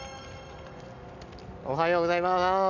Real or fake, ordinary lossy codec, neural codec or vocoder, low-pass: real; none; none; 7.2 kHz